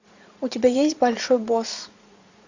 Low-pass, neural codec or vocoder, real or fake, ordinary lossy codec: 7.2 kHz; vocoder, 44.1 kHz, 80 mel bands, Vocos; fake; AAC, 48 kbps